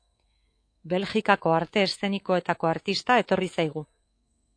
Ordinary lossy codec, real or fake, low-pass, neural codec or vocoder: AAC, 48 kbps; fake; 9.9 kHz; codec, 24 kHz, 3.1 kbps, DualCodec